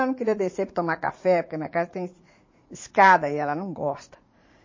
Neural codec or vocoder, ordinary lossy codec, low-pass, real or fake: vocoder, 44.1 kHz, 80 mel bands, Vocos; MP3, 32 kbps; 7.2 kHz; fake